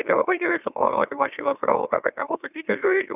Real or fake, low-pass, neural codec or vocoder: fake; 3.6 kHz; autoencoder, 44.1 kHz, a latent of 192 numbers a frame, MeloTTS